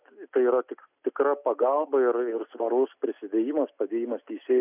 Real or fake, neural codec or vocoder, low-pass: real; none; 3.6 kHz